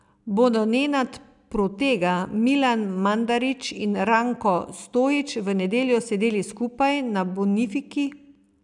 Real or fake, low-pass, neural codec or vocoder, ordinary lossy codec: real; 10.8 kHz; none; none